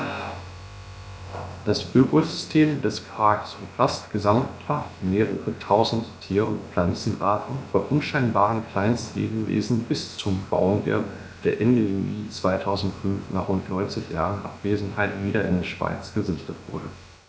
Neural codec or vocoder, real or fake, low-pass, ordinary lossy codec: codec, 16 kHz, about 1 kbps, DyCAST, with the encoder's durations; fake; none; none